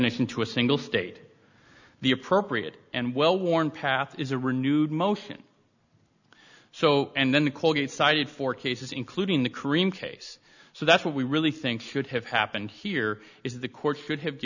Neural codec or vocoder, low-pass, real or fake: none; 7.2 kHz; real